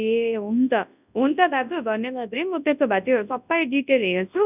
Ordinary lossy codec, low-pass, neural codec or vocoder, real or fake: none; 3.6 kHz; codec, 24 kHz, 0.9 kbps, WavTokenizer, large speech release; fake